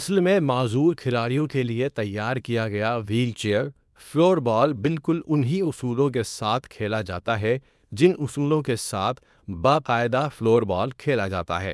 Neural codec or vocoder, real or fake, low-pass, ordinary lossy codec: codec, 24 kHz, 0.9 kbps, WavTokenizer, small release; fake; none; none